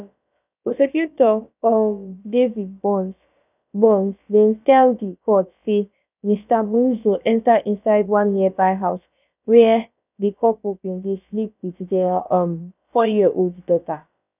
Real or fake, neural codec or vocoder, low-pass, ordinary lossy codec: fake; codec, 16 kHz, about 1 kbps, DyCAST, with the encoder's durations; 3.6 kHz; none